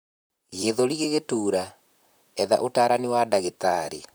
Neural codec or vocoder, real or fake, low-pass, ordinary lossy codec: vocoder, 44.1 kHz, 128 mel bands, Pupu-Vocoder; fake; none; none